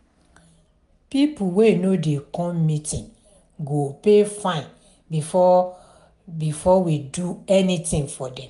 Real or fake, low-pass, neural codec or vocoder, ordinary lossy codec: real; 10.8 kHz; none; none